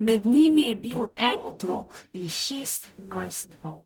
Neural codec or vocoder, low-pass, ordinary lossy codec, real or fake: codec, 44.1 kHz, 0.9 kbps, DAC; none; none; fake